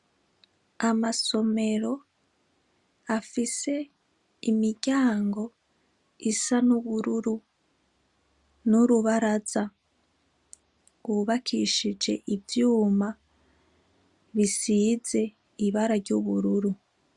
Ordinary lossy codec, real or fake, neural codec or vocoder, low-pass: Opus, 64 kbps; real; none; 10.8 kHz